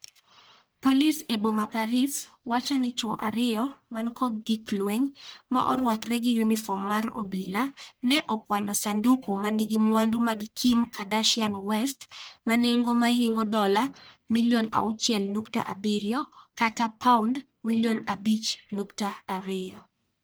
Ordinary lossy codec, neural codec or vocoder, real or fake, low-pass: none; codec, 44.1 kHz, 1.7 kbps, Pupu-Codec; fake; none